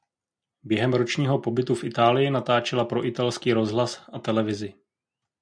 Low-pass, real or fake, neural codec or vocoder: 9.9 kHz; real; none